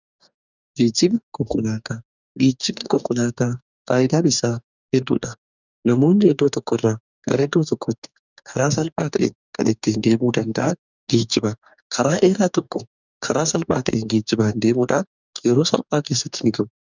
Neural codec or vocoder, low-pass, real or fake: codec, 44.1 kHz, 2.6 kbps, DAC; 7.2 kHz; fake